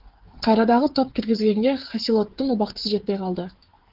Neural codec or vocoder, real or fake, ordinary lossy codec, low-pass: codec, 16 kHz, 16 kbps, FreqCodec, smaller model; fake; Opus, 16 kbps; 5.4 kHz